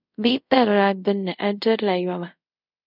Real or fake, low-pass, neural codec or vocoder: fake; 5.4 kHz; codec, 24 kHz, 0.5 kbps, DualCodec